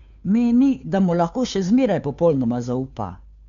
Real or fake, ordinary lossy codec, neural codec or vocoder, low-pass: fake; none; codec, 16 kHz, 2 kbps, FunCodec, trained on Chinese and English, 25 frames a second; 7.2 kHz